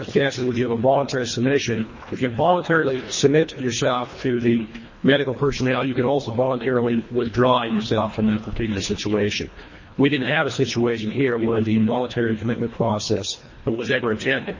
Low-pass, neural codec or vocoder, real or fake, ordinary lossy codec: 7.2 kHz; codec, 24 kHz, 1.5 kbps, HILCodec; fake; MP3, 32 kbps